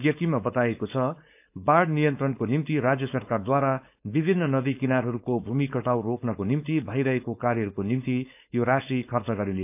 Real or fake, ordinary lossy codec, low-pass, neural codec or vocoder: fake; AAC, 32 kbps; 3.6 kHz; codec, 16 kHz, 4.8 kbps, FACodec